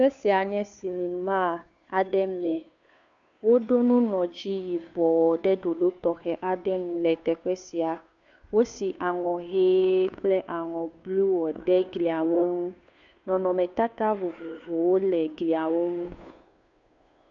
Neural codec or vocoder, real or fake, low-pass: codec, 16 kHz, 2 kbps, FunCodec, trained on Chinese and English, 25 frames a second; fake; 7.2 kHz